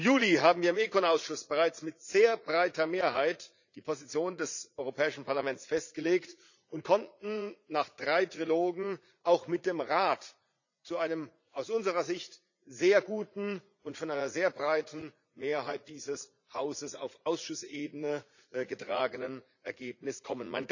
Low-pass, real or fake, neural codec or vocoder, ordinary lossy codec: 7.2 kHz; fake; vocoder, 44.1 kHz, 80 mel bands, Vocos; none